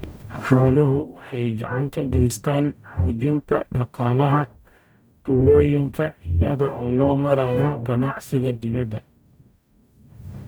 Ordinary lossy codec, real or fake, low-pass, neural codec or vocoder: none; fake; none; codec, 44.1 kHz, 0.9 kbps, DAC